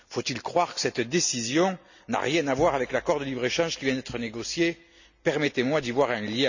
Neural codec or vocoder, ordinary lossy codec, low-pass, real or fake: none; none; 7.2 kHz; real